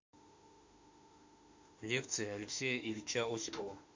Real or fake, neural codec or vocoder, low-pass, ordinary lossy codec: fake; autoencoder, 48 kHz, 32 numbers a frame, DAC-VAE, trained on Japanese speech; 7.2 kHz; MP3, 48 kbps